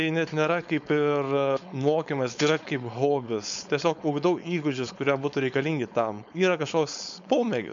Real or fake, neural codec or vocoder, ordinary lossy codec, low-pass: fake; codec, 16 kHz, 4.8 kbps, FACodec; MP3, 64 kbps; 7.2 kHz